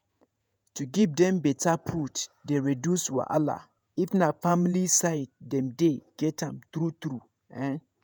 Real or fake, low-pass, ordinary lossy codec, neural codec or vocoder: fake; none; none; vocoder, 48 kHz, 128 mel bands, Vocos